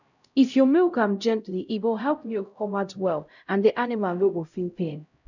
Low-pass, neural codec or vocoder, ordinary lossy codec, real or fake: 7.2 kHz; codec, 16 kHz, 0.5 kbps, X-Codec, HuBERT features, trained on LibriSpeech; none; fake